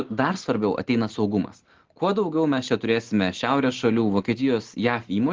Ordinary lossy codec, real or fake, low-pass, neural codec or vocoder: Opus, 16 kbps; real; 7.2 kHz; none